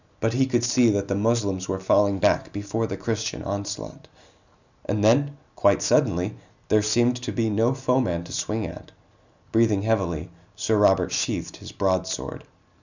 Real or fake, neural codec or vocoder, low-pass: real; none; 7.2 kHz